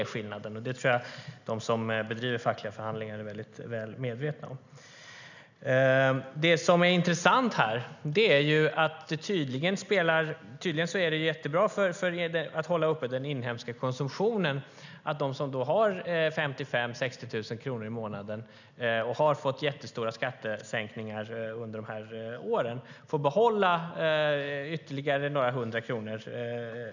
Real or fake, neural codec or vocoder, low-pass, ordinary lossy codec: real; none; 7.2 kHz; none